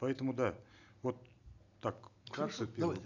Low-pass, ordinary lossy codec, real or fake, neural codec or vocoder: 7.2 kHz; none; real; none